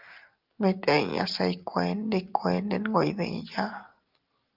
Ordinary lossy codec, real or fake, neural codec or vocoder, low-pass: Opus, 24 kbps; real; none; 5.4 kHz